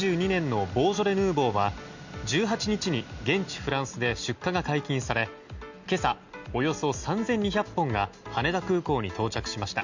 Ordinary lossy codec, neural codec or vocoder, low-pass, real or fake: none; none; 7.2 kHz; real